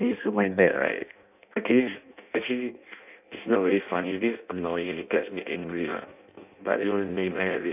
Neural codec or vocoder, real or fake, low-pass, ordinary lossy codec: codec, 16 kHz in and 24 kHz out, 0.6 kbps, FireRedTTS-2 codec; fake; 3.6 kHz; none